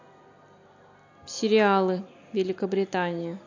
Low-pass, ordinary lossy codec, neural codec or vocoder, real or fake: 7.2 kHz; AAC, 48 kbps; none; real